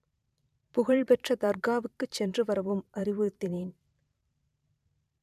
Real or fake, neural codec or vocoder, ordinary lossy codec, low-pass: fake; vocoder, 44.1 kHz, 128 mel bands every 512 samples, BigVGAN v2; none; 14.4 kHz